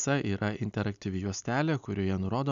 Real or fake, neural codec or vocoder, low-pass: real; none; 7.2 kHz